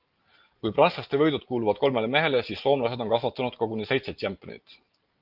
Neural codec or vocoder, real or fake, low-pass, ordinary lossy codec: none; real; 5.4 kHz; Opus, 24 kbps